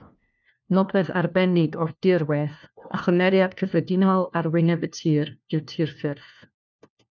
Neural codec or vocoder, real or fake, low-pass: codec, 16 kHz, 1 kbps, FunCodec, trained on LibriTTS, 50 frames a second; fake; 7.2 kHz